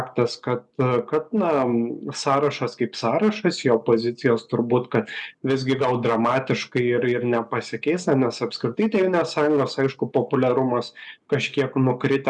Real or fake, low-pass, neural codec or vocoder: real; 10.8 kHz; none